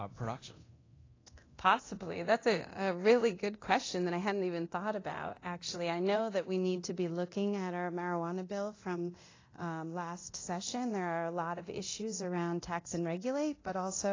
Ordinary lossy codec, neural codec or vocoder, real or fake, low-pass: AAC, 32 kbps; codec, 24 kHz, 0.9 kbps, DualCodec; fake; 7.2 kHz